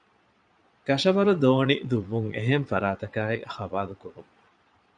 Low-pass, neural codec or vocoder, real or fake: 9.9 kHz; vocoder, 22.05 kHz, 80 mel bands, Vocos; fake